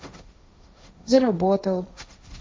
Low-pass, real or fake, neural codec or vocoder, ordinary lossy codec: none; fake; codec, 16 kHz, 1.1 kbps, Voila-Tokenizer; none